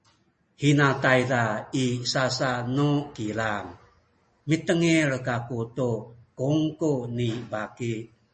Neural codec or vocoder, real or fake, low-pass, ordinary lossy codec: none; real; 10.8 kHz; MP3, 32 kbps